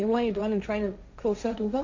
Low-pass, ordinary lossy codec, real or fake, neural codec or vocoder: 7.2 kHz; AAC, 48 kbps; fake; codec, 16 kHz, 1.1 kbps, Voila-Tokenizer